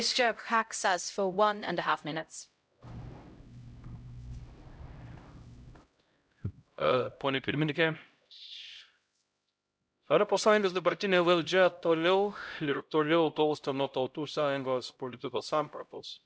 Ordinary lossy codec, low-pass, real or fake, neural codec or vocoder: none; none; fake; codec, 16 kHz, 0.5 kbps, X-Codec, HuBERT features, trained on LibriSpeech